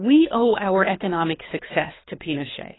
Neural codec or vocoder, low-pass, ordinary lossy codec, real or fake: codec, 24 kHz, 3 kbps, HILCodec; 7.2 kHz; AAC, 16 kbps; fake